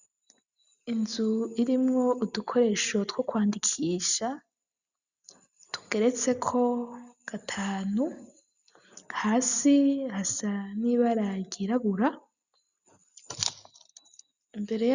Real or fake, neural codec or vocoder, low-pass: real; none; 7.2 kHz